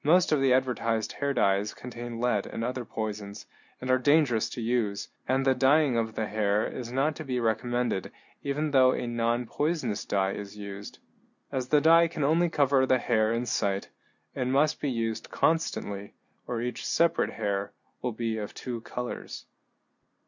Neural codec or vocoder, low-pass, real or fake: none; 7.2 kHz; real